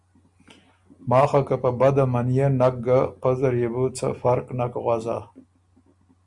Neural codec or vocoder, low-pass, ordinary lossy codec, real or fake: none; 10.8 kHz; Opus, 64 kbps; real